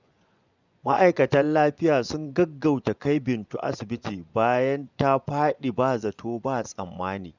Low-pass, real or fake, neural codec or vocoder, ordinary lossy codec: 7.2 kHz; fake; vocoder, 24 kHz, 100 mel bands, Vocos; none